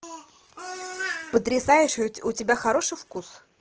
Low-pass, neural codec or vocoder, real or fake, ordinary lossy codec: 7.2 kHz; none; real; Opus, 16 kbps